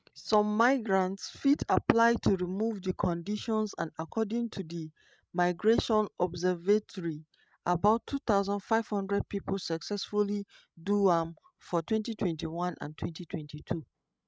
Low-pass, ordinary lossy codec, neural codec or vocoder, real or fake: none; none; codec, 16 kHz, 16 kbps, FreqCodec, larger model; fake